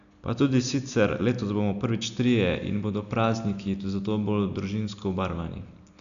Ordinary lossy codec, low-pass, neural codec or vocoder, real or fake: none; 7.2 kHz; none; real